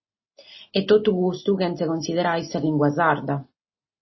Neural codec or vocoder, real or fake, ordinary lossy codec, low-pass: none; real; MP3, 24 kbps; 7.2 kHz